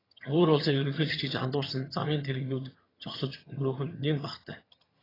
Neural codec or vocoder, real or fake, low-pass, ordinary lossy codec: vocoder, 22.05 kHz, 80 mel bands, HiFi-GAN; fake; 5.4 kHz; AAC, 24 kbps